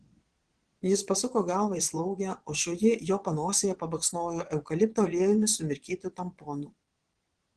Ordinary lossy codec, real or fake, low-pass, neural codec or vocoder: Opus, 16 kbps; fake; 9.9 kHz; vocoder, 22.05 kHz, 80 mel bands, Vocos